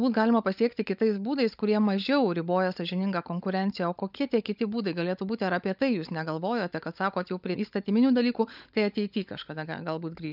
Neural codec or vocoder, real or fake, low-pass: codec, 16 kHz, 16 kbps, FunCodec, trained on LibriTTS, 50 frames a second; fake; 5.4 kHz